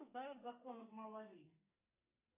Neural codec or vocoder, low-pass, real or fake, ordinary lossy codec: codec, 44.1 kHz, 2.6 kbps, SNAC; 3.6 kHz; fake; AAC, 32 kbps